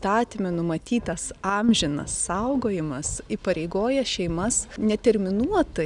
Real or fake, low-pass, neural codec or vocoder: real; 10.8 kHz; none